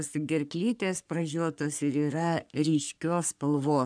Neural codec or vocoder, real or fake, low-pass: codec, 44.1 kHz, 3.4 kbps, Pupu-Codec; fake; 9.9 kHz